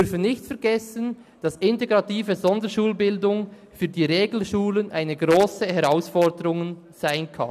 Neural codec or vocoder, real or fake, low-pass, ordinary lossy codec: none; real; 14.4 kHz; none